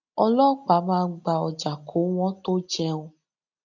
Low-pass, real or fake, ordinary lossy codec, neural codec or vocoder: 7.2 kHz; real; none; none